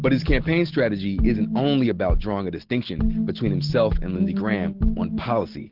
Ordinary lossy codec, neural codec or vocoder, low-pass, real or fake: Opus, 16 kbps; none; 5.4 kHz; real